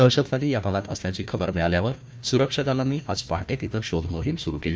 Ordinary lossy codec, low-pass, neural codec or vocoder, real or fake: none; none; codec, 16 kHz, 1 kbps, FunCodec, trained on Chinese and English, 50 frames a second; fake